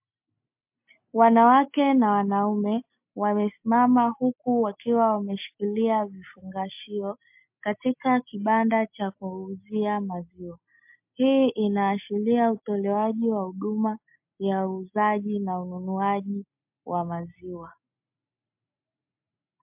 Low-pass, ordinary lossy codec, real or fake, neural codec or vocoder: 3.6 kHz; MP3, 32 kbps; real; none